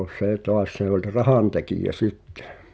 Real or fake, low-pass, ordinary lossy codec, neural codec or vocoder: real; none; none; none